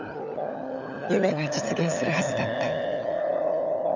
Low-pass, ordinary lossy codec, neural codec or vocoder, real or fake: 7.2 kHz; none; codec, 16 kHz, 16 kbps, FunCodec, trained on LibriTTS, 50 frames a second; fake